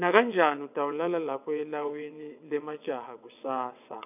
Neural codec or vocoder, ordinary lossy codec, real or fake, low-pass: vocoder, 22.05 kHz, 80 mel bands, WaveNeXt; none; fake; 3.6 kHz